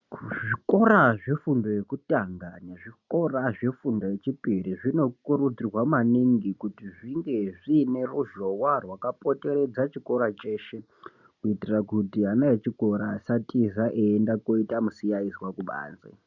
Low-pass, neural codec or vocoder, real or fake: 7.2 kHz; none; real